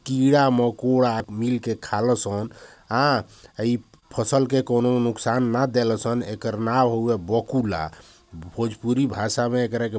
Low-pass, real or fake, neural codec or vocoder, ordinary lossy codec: none; real; none; none